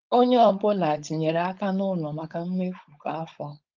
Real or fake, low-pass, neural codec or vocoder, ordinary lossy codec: fake; 7.2 kHz; codec, 16 kHz, 4.8 kbps, FACodec; Opus, 32 kbps